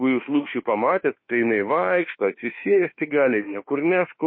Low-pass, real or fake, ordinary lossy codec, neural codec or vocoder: 7.2 kHz; fake; MP3, 24 kbps; autoencoder, 48 kHz, 32 numbers a frame, DAC-VAE, trained on Japanese speech